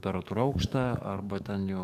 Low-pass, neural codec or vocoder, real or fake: 14.4 kHz; codec, 44.1 kHz, 7.8 kbps, DAC; fake